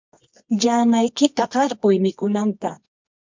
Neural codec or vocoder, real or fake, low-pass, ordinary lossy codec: codec, 24 kHz, 0.9 kbps, WavTokenizer, medium music audio release; fake; 7.2 kHz; MP3, 64 kbps